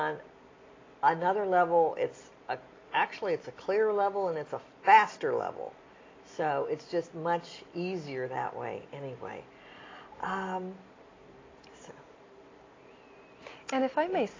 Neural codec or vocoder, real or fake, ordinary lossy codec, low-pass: none; real; AAC, 32 kbps; 7.2 kHz